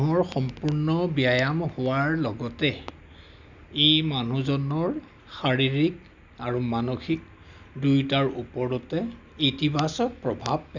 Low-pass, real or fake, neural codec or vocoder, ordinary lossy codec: 7.2 kHz; real; none; none